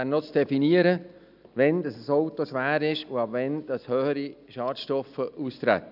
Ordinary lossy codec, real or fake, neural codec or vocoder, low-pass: none; real; none; 5.4 kHz